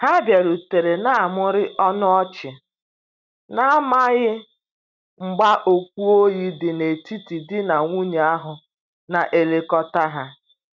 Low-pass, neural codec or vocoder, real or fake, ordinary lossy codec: 7.2 kHz; none; real; none